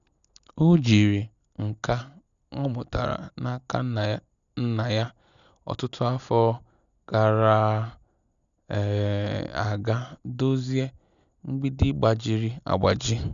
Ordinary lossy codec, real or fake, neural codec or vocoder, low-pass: none; real; none; 7.2 kHz